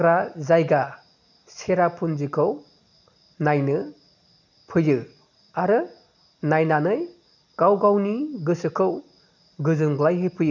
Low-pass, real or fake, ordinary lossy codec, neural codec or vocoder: 7.2 kHz; real; none; none